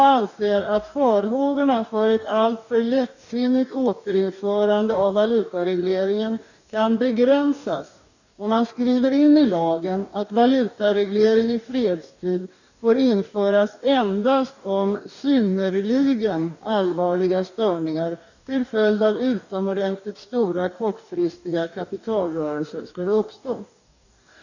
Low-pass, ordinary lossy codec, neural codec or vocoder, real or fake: 7.2 kHz; none; codec, 44.1 kHz, 2.6 kbps, DAC; fake